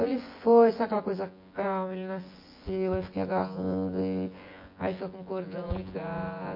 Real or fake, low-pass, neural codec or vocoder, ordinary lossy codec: fake; 5.4 kHz; vocoder, 24 kHz, 100 mel bands, Vocos; MP3, 32 kbps